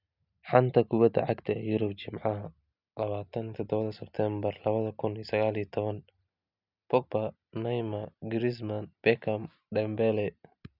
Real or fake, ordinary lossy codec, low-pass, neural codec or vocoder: real; none; 5.4 kHz; none